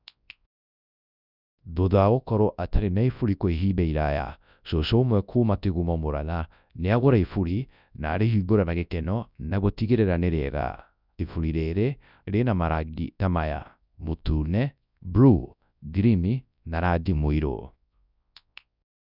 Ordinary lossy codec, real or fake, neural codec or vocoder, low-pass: none; fake; codec, 24 kHz, 0.9 kbps, WavTokenizer, large speech release; 5.4 kHz